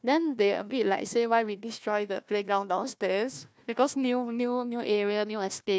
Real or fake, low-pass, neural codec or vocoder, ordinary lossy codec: fake; none; codec, 16 kHz, 1 kbps, FunCodec, trained on Chinese and English, 50 frames a second; none